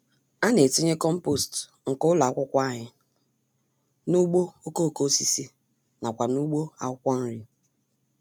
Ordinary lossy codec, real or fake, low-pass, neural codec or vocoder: none; real; none; none